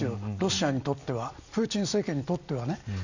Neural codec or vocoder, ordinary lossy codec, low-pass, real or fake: none; none; 7.2 kHz; real